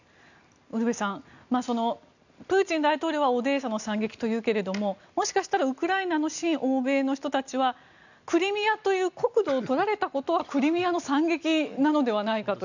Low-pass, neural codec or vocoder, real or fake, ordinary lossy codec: 7.2 kHz; none; real; none